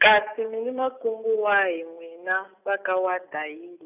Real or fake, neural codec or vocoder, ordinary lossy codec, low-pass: real; none; none; 3.6 kHz